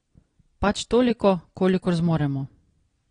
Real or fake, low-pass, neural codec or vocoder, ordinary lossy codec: real; 9.9 kHz; none; AAC, 32 kbps